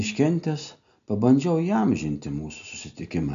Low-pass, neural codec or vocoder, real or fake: 7.2 kHz; none; real